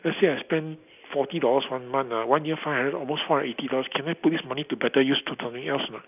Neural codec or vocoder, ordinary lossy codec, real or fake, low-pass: none; none; real; 3.6 kHz